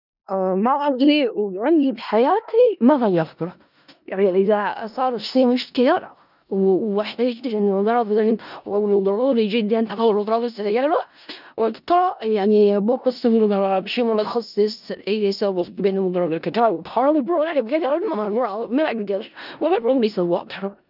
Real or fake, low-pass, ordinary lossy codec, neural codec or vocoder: fake; 5.4 kHz; none; codec, 16 kHz in and 24 kHz out, 0.4 kbps, LongCat-Audio-Codec, four codebook decoder